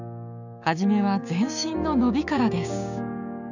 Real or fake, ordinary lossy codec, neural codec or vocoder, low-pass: fake; none; codec, 16 kHz, 6 kbps, DAC; 7.2 kHz